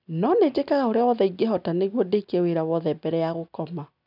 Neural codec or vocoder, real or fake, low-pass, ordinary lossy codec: none; real; 5.4 kHz; none